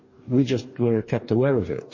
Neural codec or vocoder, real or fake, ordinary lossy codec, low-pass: codec, 44.1 kHz, 2.6 kbps, DAC; fake; MP3, 32 kbps; 7.2 kHz